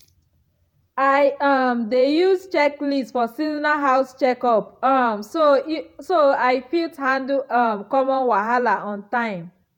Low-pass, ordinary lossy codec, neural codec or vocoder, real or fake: 19.8 kHz; none; vocoder, 48 kHz, 128 mel bands, Vocos; fake